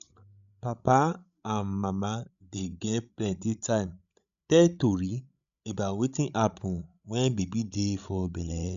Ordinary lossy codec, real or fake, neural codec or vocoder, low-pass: none; fake; codec, 16 kHz, 16 kbps, FreqCodec, larger model; 7.2 kHz